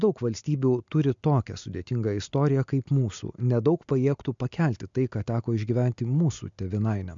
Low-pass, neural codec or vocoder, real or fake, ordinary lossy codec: 7.2 kHz; none; real; MP3, 64 kbps